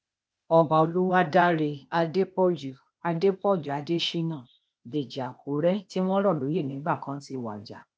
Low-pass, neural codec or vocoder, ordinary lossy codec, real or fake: none; codec, 16 kHz, 0.8 kbps, ZipCodec; none; fake